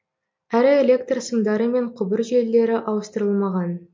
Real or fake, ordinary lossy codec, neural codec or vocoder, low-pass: real; MP3, 48 kbps; none; 7.2 kHz